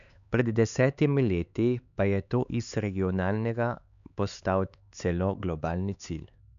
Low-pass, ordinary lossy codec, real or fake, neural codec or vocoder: 7.2 kHz; none; fake; codec, 16 kHz, 4 kbps, X-Codec, HuBERT features, trained on LibriSpeech